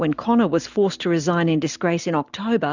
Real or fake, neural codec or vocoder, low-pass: real; none; 7.2 kHz